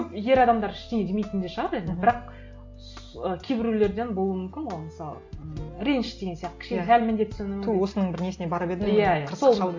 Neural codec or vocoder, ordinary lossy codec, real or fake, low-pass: none; MP3, 48 kbps; real; 7.2 kHz